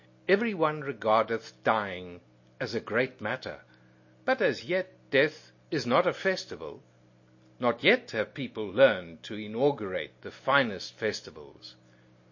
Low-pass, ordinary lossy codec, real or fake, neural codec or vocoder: 7.2 kHz; MP3, 32 kbps; real; none